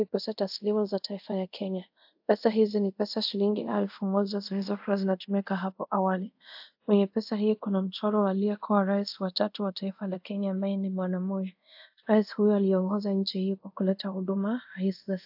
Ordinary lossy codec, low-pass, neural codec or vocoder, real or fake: AAC, 48 kbps; 5.4 kHz; codec, 24 kHz, 0.5 kbps, DualCodec; fake